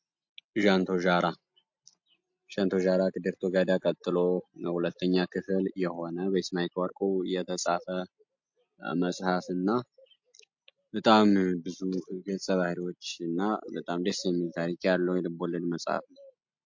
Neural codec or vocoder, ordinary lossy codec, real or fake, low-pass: none; MP3, 48 kbps; real; 7.2 kHz